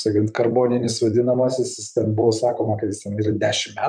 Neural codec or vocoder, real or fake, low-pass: vocoder, 44.1 kHz, 128 mel bands, Pupu-Vocoder; fake; 9.9 kHz